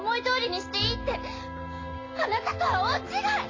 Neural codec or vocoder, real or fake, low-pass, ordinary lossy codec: none; real; 7.2 kHz; AAC, 32 kbps